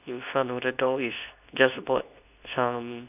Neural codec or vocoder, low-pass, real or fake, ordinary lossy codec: codec, 24 kHz, 0.9 kbps, WavTokenizer, medium speech release version 2; 3.6 kHz; fake; none